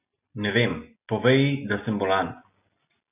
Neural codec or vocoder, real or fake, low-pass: none; real; 3.6 kHz